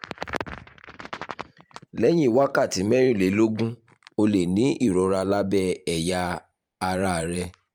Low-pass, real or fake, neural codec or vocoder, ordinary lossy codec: 19.8 kHz; fake; vocoder, 44.1 kHz, 128 mel bands every 512 samples, BigVGAN v2; MP3, 96 kbps